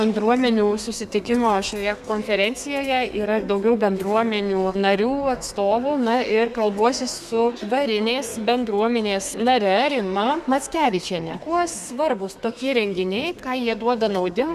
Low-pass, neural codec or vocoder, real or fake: 14.4 kHz; codec, 32 kHz, 1.9 kbps, SNAC; fake